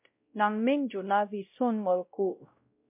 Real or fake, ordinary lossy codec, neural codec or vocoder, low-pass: fake; MP3, 32 kbps; codec, 16 kHz, 0.5 kbps, X-Codec, WavLM features, trained on Multilingual LibriSpeech; 3.6 kHz